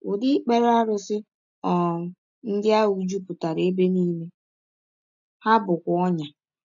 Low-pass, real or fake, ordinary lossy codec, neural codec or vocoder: 7.2 kHz; real; none; none